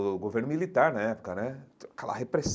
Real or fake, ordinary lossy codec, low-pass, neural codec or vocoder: real; none; none; none